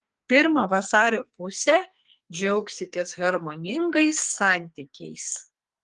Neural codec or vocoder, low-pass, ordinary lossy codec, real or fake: codec, 44.1 kHz, 2.6 kbps, SNAC; 10.8 kHz; Opus, 24 kbps; fake